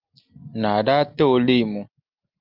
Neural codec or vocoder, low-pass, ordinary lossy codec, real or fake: none; 5.4 kHz; Opus, 24 kbps; real